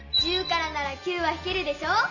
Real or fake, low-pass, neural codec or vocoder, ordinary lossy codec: real; 7.2 kHz; none; none